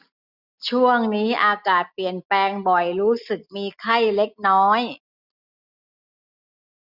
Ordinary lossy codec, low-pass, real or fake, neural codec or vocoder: none; 5.4 kHz; real; none